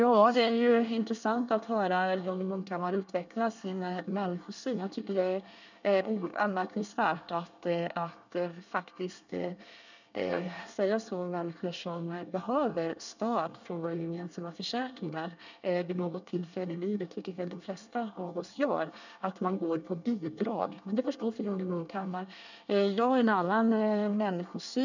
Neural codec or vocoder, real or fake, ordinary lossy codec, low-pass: codec, 24 kHz, 1 kbps, SNAC; fake; none; 7.2 kHz